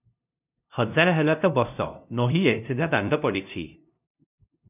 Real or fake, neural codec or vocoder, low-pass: fake; codec, 16 kHz, 0.5 kbps, FunCodec, trained on LibriTTS, 25 frames a second; 3.6 kHz